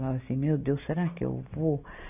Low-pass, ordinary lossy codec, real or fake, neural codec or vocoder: 3.6 kHz; none; real; none